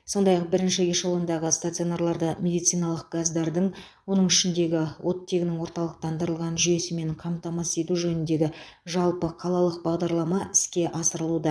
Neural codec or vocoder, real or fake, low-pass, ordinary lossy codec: vocoder, 22.05 kHz, 80 mel bands, Vocos; fake; none; none